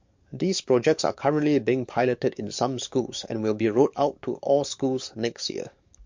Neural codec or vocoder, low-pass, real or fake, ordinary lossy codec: codec, 44.1 kHz, 7.8 kbps, DAC; 7.2 kHz; fake; MP3, 48 kbps